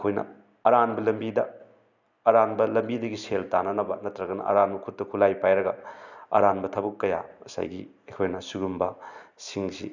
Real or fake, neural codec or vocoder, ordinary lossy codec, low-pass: real; none; none; 7.2 kHz